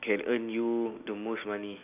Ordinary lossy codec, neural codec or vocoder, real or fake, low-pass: none; none; real; 3.6 kHz